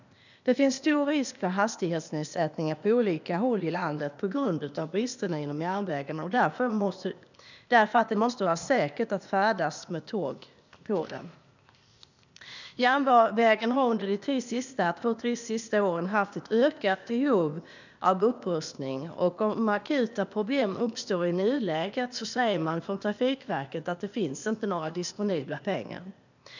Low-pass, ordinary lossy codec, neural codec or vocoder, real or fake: 7.2 kHz; none; codec, 16 kHz, 0.8 kbps, ZipCodec; fake